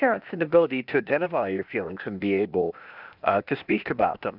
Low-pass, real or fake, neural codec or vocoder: 5.4 kHz; fake; codec, 16 kHz, 0.8 kbps, ZipCodec